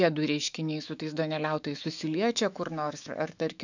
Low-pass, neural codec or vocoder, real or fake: 7.2 kHz; autoencoder, 48 kHz, 128 numbers a frame, DAC-VAE, trained on Japanese speech; fake